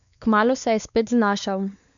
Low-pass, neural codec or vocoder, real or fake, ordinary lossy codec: 7.2 kHz; codec, 16 kHz, 4 kbps, X-Codec, WavLM features, trained on Multilingual LibriSpeech; fake; none